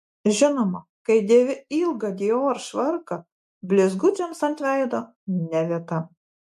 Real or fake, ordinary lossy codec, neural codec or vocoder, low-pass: real; MP3, 64 kbps; none; 14.4 kHz